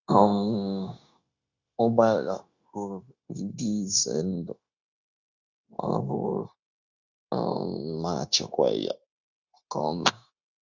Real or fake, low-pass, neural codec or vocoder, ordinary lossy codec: fake; 7.2 kHz; codec, 16 kHz, 0.9 kbps, LongCat-Audio-Codec; Opus, 64 kbps